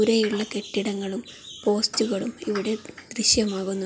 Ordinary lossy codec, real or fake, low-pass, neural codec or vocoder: none; real; none; none